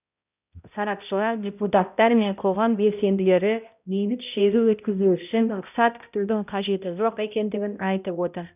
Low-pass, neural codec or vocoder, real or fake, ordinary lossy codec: 3.6 kHz; codec, 16 kHz, 0.5 kbps, X-Codec, HuBERT features, trained on balanced general audio; fake; none